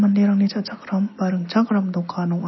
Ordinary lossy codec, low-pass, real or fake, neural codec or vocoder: MP3, 24 kbps; 7.2 kHz; real; none